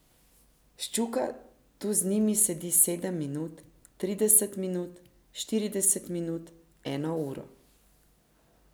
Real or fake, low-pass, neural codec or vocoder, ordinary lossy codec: real; none; none; none